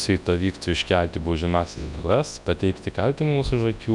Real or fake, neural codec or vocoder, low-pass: fake; codec, 24 kHz, 0.9 kbps, WavTokenizer, large speech release; 10.8 kHz